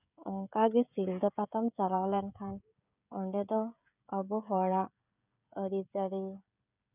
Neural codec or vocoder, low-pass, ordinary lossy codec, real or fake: codec, 16 kHz, 16 kbps, FreqCodec, smaller model; 3.6 kHz; none; fake